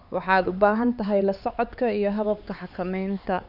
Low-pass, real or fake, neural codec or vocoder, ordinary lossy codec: 5.4 kHz; fake; codec, 16 kHz, 4 kbps, X-Codec, HuBERT features, trained on LibriSpeech; none